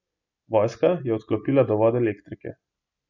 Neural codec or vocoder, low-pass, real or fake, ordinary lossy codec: none; 7.2 kHz; real; none